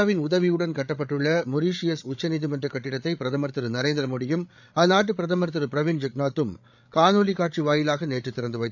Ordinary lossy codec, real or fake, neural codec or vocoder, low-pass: none; fake; codec, 16 kHz, 8 kbps, FreqCodec, larger model; 7.2 kHz